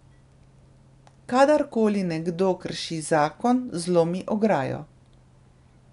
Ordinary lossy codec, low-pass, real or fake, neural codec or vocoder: none; 10.8 kHz; real; none